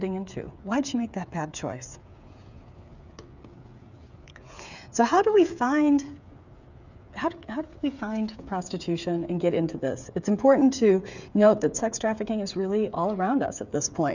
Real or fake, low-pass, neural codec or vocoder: fake; 7.2 kHz; codec, 16 kHz, 8 kbps, FreqCodec, smaller model